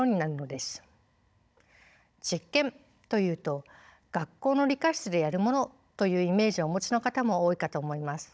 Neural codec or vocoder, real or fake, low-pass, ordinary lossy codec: codec, 16 kHz, 16 kbps, FunCodec, trained on Chinese and English, 50 frames a second; fake; none; none